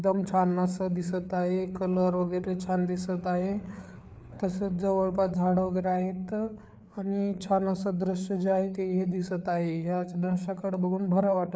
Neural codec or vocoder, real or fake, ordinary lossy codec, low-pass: codec, 16 kHz, 4 kbps, FreqCodec, larger model; fake; none; none